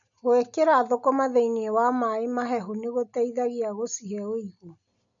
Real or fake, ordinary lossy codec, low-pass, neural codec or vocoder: real; none; 7.2 kHz; none